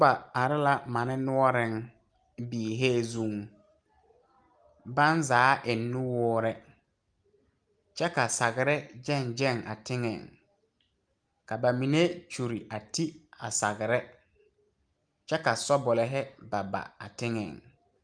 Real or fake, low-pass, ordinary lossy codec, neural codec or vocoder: real; 9.9 kHz; Opus, 32 kbps; none